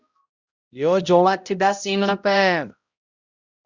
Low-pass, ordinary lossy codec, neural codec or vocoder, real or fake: 7.2 kHz; Opus, 64 kbps; codec, 16 kHz, 0.5 kbps, X-Codec, HuBERT features, trained on balanced general audio; fake